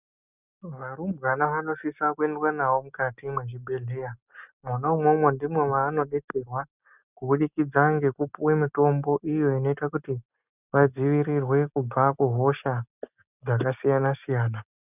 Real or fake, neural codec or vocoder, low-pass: real; none; 3.6 kHz